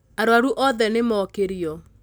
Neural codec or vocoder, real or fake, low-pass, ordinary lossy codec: none; real; none; none